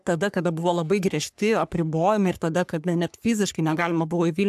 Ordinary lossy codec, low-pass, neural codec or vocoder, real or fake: AAC, 96 kbps; 14.4 kHz; codec, 44.1 kHz, 3.4 kbps, Pupu-Codec; fake